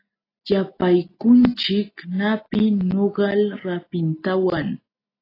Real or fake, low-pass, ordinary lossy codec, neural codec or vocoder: real; 5.4 kHz; AAC, 24 kbps; none